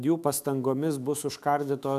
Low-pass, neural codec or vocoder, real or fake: 14.4 kHz; autoencoder, 48 kHz, 128 numbers a frame, DAC-VAE, trained on Japanese speech; fake